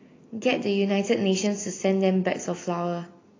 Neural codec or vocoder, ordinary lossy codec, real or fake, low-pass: none; AAC, 32 kbps; real; 7.2 kHz